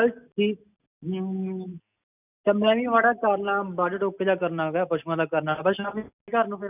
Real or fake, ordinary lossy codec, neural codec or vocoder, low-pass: real; none; none; 3.6 kHz